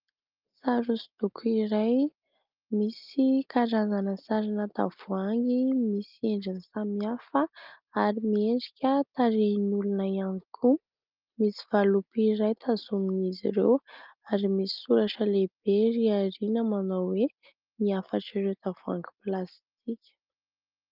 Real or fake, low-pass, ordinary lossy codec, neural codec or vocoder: real; 5.4 kHz; Opus, 24 kbps; none